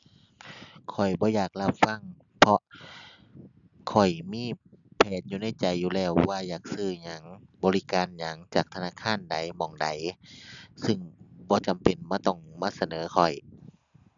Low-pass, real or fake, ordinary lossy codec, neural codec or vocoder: 7.2 kHz; real; none; none